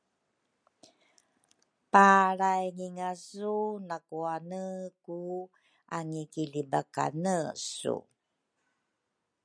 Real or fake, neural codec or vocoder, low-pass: real; none; 9.9 kHz